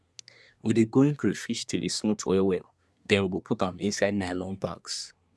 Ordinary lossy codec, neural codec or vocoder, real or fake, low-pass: none; codec, 24 kHz, 1 kbps, SNAC; fake; none